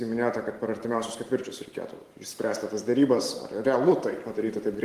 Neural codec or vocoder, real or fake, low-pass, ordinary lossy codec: vocoder, 44.1 kHz, 128 mel bands every 512 samples, BigVGAN v2; fake; 14.4 kHz; Opus, 24 kbps